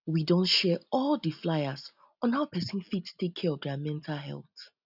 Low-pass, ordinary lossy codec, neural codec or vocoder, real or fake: 5.4 kHz; none; none; real